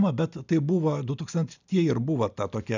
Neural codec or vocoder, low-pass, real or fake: none; 7.2 kHz; real